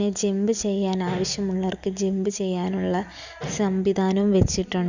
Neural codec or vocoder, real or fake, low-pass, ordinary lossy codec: none; real; 7.2 kHz; none